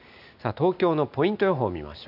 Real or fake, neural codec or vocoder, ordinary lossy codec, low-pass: real; none; none; 5.4 kHz